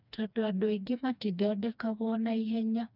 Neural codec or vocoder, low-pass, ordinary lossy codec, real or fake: codec, 16 kHz, 2 kbps, FreqCodec, smaller model; 5.4 kHz; none; fake